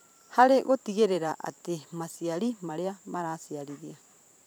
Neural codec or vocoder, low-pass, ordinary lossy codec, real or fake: vocoder, 44.1 kHz, 128 mel bands every 512 samples, BigVGAN v2; none; none; fake